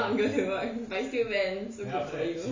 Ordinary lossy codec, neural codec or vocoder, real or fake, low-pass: MP3, 64 kbps; none; real; 7.2 kHz